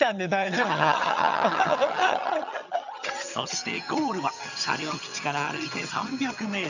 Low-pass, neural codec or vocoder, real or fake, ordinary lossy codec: 7.2 kHz; vocoder, 22.05 kHz, 80 mel bands, HiFi-GAN; fake; none